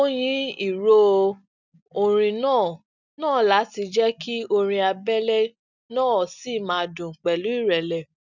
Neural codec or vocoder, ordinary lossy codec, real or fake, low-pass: none; none; real; 7.2 kHz